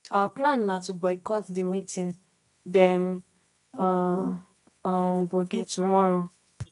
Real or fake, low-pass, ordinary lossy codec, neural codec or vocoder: fake; 10.8 kHz; MP3, 96 kbps; codec, 24 kHz, 0.9 kbps, WavTokenizer, medium music audio release